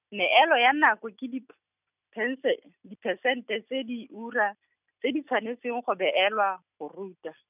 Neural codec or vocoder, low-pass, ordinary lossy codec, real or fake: none; 3.6 kHz; none; real